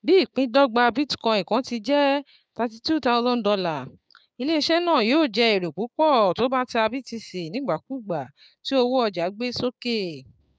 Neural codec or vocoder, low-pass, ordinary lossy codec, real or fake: codec, 16 kHz, 6 kbps, DAC; none; none; fake